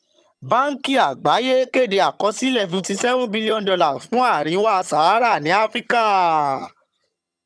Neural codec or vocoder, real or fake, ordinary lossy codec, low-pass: vocoder, 22.05 kHz, 80 mel bands, HiFi-GAN; fake; none; none